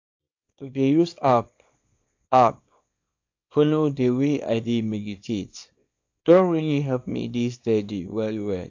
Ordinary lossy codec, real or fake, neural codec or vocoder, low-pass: AAC, 48 kbps; fake; codec, 24 kHz, 0.9 kbps, WavTokenizer, small release; 7.2 kHz